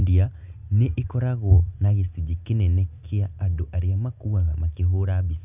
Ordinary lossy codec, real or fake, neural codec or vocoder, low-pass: none; real; none; 3.6 kHz